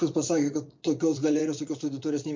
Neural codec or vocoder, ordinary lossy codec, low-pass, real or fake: vocoder, 44.1 kHz, 80 mel bands, Vocos; MP3, 48 kbps; 7.2 kHz; fake